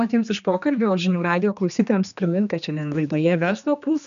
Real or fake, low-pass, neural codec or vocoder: fake; 7.2 kHz; codec, 16 kHz, 2 kbps, X-Codec, HuBERT features, trained on general audio